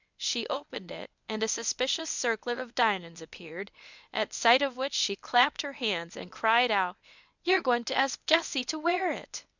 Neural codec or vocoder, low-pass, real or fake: codec, 24 kHz, 0.9 kbps, WavTokenizer, medium speech release version 1; 7.2 kHz; fake